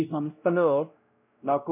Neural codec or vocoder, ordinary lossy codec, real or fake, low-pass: codec, 16 kHz, 0.5 kbps, X-Codec, WavLM features, trained on Multilingual LibriSpeech; MP3, 32 kbps; fake; 3.6 kHz